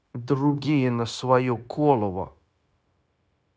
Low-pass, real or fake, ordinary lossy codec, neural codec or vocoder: none; fake; none; codec, 16 kHz, 0.9 kbps, LongCat-Audio-Codec